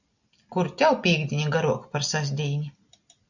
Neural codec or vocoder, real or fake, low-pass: none; real; 7.2 kHz